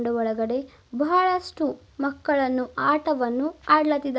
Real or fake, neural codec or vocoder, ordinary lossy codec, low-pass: real; none; none; none